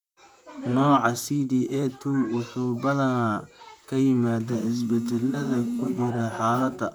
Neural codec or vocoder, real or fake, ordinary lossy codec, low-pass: vocoder, 44.1 kHz, 128 mel bands, Pupu-Vocoder; fake; none; 19.8 kHz